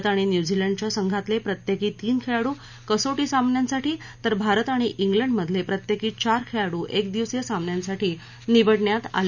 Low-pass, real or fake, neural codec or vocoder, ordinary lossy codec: 7.2 kHz; real; none; none